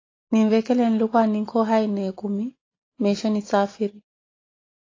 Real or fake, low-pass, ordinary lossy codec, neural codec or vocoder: real; 7.2 kHz; AAC, 32 kbps; none